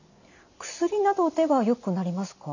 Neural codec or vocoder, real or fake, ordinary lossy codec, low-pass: none; real; none; 7.2 kHz